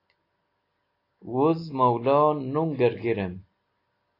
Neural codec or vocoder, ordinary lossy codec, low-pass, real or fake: none; AAC, 32 kbps; 5.4 kHz; real